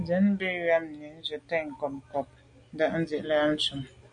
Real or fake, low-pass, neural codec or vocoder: real; 9.9 kHz; none